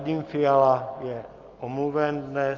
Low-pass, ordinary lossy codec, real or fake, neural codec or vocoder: 7.2 kHz; Opus, 24 kbps; real; none